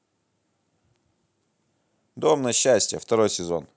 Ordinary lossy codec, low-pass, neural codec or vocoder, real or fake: none; none; none; real